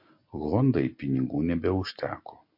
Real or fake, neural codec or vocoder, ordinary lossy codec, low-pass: real; none; MP3, 32 kbps; 5.4 kHz